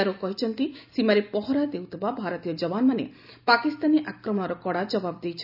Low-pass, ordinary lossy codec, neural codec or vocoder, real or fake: 5.4 kHz; none; none; real